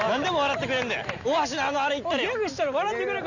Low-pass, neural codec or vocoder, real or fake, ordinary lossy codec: 7.2 kHz; none; real; none